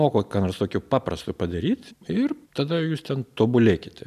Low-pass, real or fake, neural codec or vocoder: 14.4 kHz; real; none